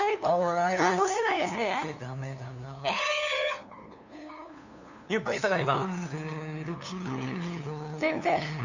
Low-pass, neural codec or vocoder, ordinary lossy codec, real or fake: 7.2 kHz; codec, 16 kHz, 2 kbps, FunCodec, trained on LibriTTS, 25 frames a second; none; fake